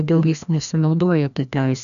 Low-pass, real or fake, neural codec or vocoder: 7.2 kHz; fake; codec, 16 kHz, 1 kbps, FreqCodec, larger model